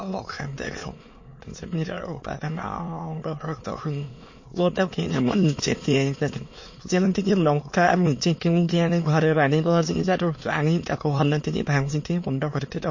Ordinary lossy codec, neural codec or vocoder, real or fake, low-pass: MP3, 32 kbps; autoencoder, 22.05 kHz, a latent of 192 numbers a frame, VITS, trained on many speakers; fake; 7.2 kHz